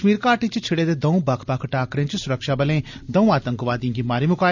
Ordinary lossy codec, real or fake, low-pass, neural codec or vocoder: none; real; 7.2 kHz; none